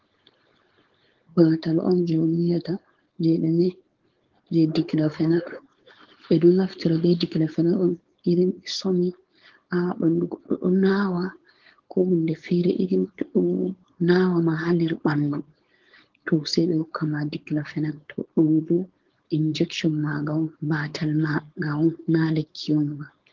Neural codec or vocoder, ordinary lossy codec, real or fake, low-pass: codec, 16 kHz, 4.8 kbps, FACodec; Opus, 16 kbps; fake; 7.2 kHz